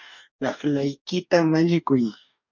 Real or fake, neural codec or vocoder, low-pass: fake; codec, 44.1 kHz, 2.6 kbps, DAC; 7.2 kHz